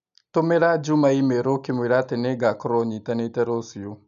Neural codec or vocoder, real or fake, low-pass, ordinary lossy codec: none; real; 7.2 kHz; none